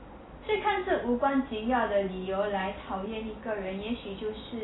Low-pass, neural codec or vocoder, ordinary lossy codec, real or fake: 7.2 kHz; none; AAC, 16 kbps; real